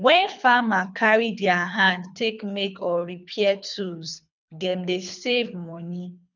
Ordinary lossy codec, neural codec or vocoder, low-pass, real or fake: none; codec, 24 kHz, 3 kbps, HILCodec; 7.2 kHz; fake